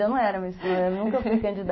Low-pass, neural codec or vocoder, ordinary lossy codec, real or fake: 7.2 kHz; autoencoder, 48 kHz, 128 numbers a frame, DAC-VAE, trained on Japanese speech; MP3, 24 kbps; fake